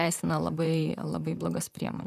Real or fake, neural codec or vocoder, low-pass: fake; vocoder, 44.1 kHz, 128 mel bands, Pupu-Vocoder; 14.4 kHz